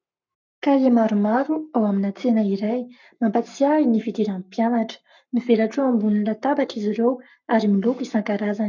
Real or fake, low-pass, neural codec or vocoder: fake; 7.2 kHz; codec, 44.1 kHz, 7.8 kbps, Pupu-Codec